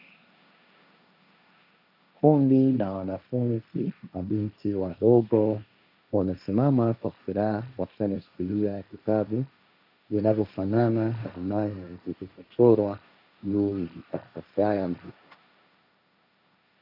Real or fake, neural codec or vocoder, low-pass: fake; codec, 16 kHz, 1.1 kbps, Voila-Tokenizer; 5.4 kHz